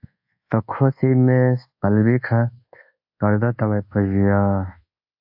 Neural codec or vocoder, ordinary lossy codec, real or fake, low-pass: codec, 24 kHz, 1.2 kbps, DualCodec; AAC, 48 kbps; fake; 5.4 kHz